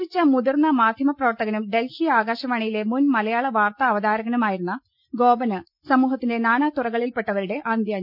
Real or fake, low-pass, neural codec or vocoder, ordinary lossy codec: real; 5.4 kHz; none; none